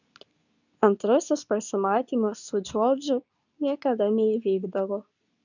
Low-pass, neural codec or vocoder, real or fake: 7.2 kHz; codec, 24 kHz, 0.9 kbps, WavTokenizer, medium speech release version 2; fake